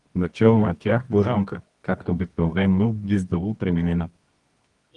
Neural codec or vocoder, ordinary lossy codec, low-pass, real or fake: codec, 24 kHz, 0.9 kbps, WavTokenizer, medium music audio release; Opus, 24 kbps; 10.8 kHz; fake